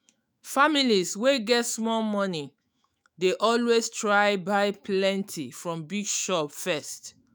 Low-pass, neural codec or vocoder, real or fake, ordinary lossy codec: none; autoencoder, 48 kHz, 128 numbers a frame, DAC-VAE, trained on Japanese speech; fake; none